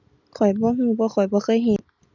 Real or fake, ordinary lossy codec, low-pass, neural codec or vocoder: real; none; 7.2 kHz; none